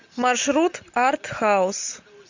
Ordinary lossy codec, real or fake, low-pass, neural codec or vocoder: MP3, 64 kbps; real; 7.2 kHz; none